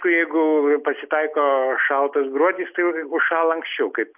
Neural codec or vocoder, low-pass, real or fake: none; 3.6 kHz; real